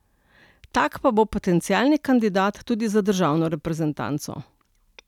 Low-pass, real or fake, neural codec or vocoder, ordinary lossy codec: 19.8 kHz; real; none; none